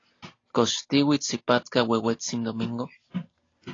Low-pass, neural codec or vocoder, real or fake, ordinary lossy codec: 7.2 kHz; none; real; AAC, 32 kbps